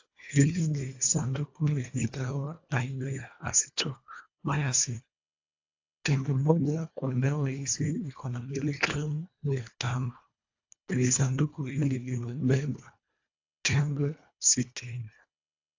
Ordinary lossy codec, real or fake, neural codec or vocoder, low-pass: AAC, 48 kbps; fake; codec, 24 kHz, 1.5 kbps, HILCodec; 7.2 kHz